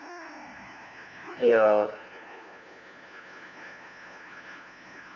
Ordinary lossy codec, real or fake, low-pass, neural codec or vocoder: none; fake; 7.2 kHz; codec, 16 kHz, 1 kbps, FunCodec, trained on LibriTTS, 50 frames a second